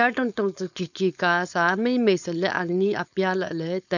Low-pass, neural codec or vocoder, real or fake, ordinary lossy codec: 7.2 kHz; codec, 16 kHz, 4.8 kbps, FACodec; fake; none